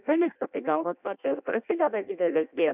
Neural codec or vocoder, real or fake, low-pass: codec, 16 kHz in and 24 kHz out, 0.6 kbps, FireRedTTS-2 codec; fake; 3.6 kHz